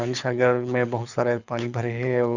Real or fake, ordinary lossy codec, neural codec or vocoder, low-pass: fake; none; codec, 24 kHz, 6 kbps, HILCodec; 7.2 kHz